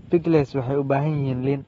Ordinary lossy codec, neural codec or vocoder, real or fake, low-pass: AAC, 24 kbps; none; real; 19.8 kHz